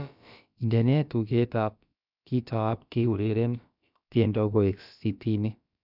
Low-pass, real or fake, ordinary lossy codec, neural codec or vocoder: 5.4 kHz; fake; none; codec, 16 kHz, about 1 kbps, DyCAST, with the encoder's durations